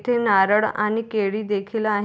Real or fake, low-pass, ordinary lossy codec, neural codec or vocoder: real; none; none; none